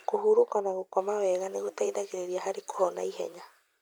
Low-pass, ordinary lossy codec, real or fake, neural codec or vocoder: none; none; fake; vocoder, 44.1 kHz, 128 mel bands every 256 samples, BigVGAN v2